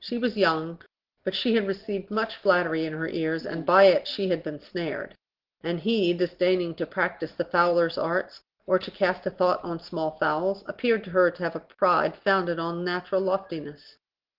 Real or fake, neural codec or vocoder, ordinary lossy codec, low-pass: real; none; Opus, 16 kbps; 5.4 kHz